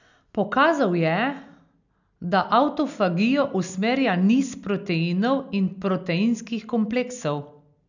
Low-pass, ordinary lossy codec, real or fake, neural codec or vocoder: 7.2 kHz; none; real; none